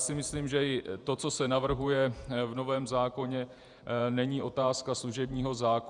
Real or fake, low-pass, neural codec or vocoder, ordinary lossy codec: fake; 10.8 kHz; vocoder, 48 kHz, 128 mel bands, Vocos; Opus, 64 kbps